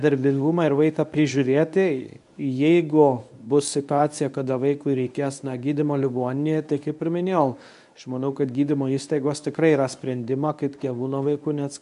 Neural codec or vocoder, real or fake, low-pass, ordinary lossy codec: codec, 24 kHz, 0.9 kbps, WavTokenizer, medium speech release version 1; fake; 10.8 kHz; AAC, 96 kbps